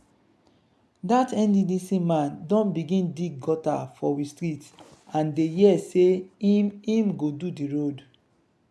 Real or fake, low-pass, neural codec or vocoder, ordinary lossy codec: real; none; none; none